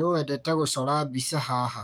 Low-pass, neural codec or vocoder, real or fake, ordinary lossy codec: none; codec, 44.1 kHz, 7.8 kbps, DAC; fake; none